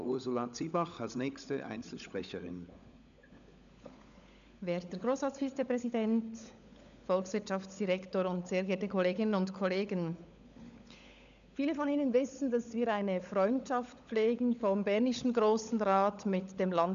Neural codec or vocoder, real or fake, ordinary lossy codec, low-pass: codec, 16 kHz, 16 kbps, FunCodec, trained on LibriTTS, 50 frames a second; fake; none; 7.2 kHz